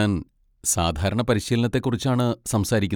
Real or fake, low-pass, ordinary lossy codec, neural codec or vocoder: real; none; none; none